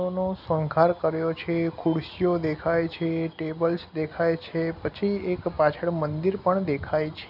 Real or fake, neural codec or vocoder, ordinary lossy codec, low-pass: real; none; none; 5.4 kHz